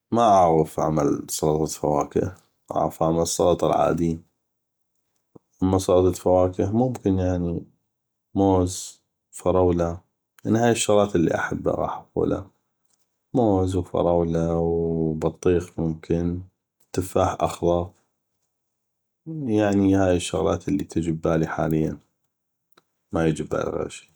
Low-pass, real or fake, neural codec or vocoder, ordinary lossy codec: none; real; none; none